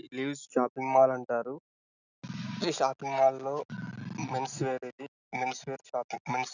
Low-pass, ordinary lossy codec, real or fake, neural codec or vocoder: 7.2 kHz; none; real; none